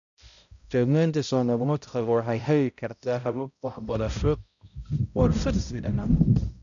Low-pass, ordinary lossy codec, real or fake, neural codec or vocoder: 7.2 kHz; none; fake; codec, 16 kHz, 0.5 kbps, X-Codec, HuBERT features, trained on balanced general audio